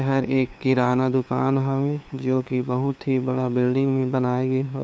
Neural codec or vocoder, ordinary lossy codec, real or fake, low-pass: codec, 16 kHz, 2 kbps, FunCodec, trained on LibriTTS, 25 frames a second; none; fake; none